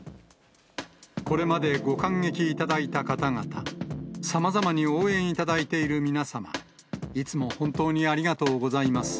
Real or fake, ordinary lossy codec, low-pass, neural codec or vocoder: real; none; none; none